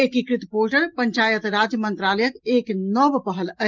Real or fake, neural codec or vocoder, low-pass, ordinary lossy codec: real; none; 7.2 kHz; Opus, 32 kbps